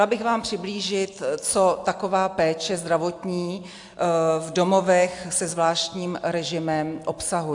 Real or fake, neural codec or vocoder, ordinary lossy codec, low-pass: real; none; AAC, 64 kbps; 10.8 kHz